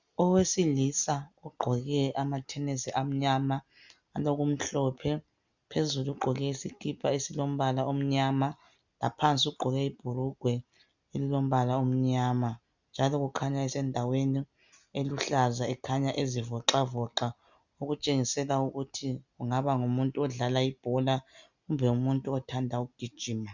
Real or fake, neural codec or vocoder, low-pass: real; none; 7.2 kHz